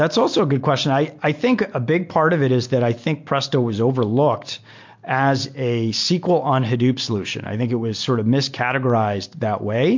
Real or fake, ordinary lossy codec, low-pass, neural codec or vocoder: real; MP3, 48 kbps; 7.2 kHz; none